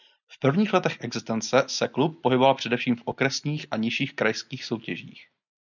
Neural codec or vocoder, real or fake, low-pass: none; real; 7.2 kHz